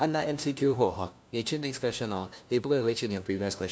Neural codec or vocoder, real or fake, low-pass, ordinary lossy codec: codec, 16 kHz, 1 kbps, FunCodec, trained on LibriTTS, 50 frames a second; fake; none; none